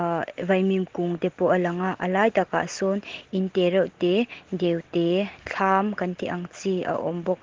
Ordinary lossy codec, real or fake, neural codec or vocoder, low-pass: Opus, 16 kbps; real; none; 7.2 kHz